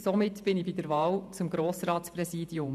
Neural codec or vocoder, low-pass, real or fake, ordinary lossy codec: vocoder, 48 kHz, 128 mel bands, Vocos; 14.4 kHz; fake; none